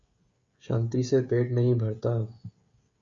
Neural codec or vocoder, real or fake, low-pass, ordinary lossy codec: codec, 16 kHz, 16 kbps, FreqCodec, smaller model; fake; 7.2 kHz; Opus, 64 kbps